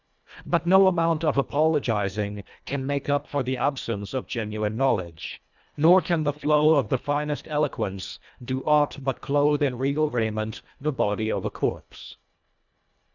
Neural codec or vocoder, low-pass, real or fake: codec, 24 kHz, 1.5 kbps, HILCodec; 7.2 kHz; fake